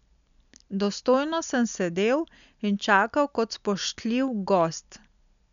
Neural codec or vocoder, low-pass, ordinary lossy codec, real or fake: none; 7.2 kHz; none; real